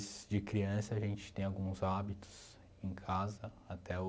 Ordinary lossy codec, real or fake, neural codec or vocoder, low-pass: none; real; none; none